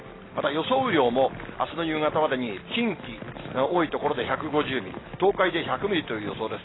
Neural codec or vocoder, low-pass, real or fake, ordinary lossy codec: none; 7.2 kHz; real; AAC, 16 kbps